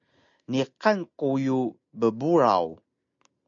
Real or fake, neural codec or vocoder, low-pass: real; none; 7.2 kHz